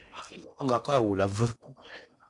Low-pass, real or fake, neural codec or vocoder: 10.8 kHz; fake; codec, 16 kHz in and 24 kHz out, 0.8 kbps, FocalCodec, streaming, 65536 codes